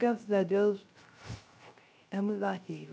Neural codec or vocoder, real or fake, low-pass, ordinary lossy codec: codec, 16 kHz, 0.3 kbps, FocalCodec; fake; none; none